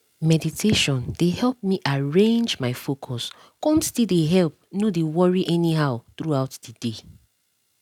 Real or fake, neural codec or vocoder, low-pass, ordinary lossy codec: real; none; 19.8 kHz; none